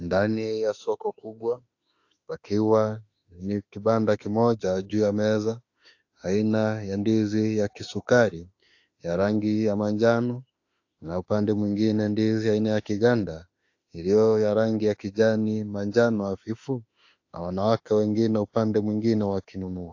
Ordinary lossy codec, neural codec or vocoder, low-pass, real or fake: AAC, 48 kbps; autoencoder, 48 kHz, 32 numbers a frame, DAC-VAE, trained on Japanese speech; 7.2 kHz; fake